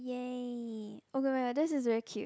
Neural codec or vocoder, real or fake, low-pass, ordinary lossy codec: none; real; none; none